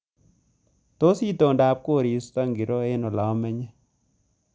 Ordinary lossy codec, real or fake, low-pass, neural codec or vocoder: none; real; none; none